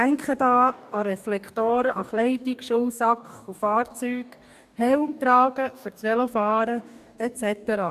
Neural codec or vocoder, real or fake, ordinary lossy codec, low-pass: codec, 44.1 kHz, 2.6 kbps, DAC; fake; none; 14.4 kHz